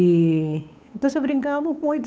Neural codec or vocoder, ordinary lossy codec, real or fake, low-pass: codec, 16 kHz, 8 kbps, FunCodec, trained on Chinese and English, 25 frames a second; none; fake; none